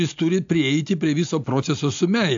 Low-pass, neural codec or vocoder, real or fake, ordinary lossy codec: 7.2 kHz; none; real; AAC, 64 kbps